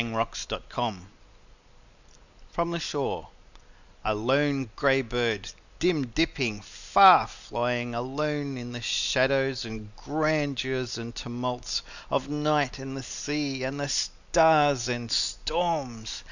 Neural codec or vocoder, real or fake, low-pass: none; real; 7.2 kHz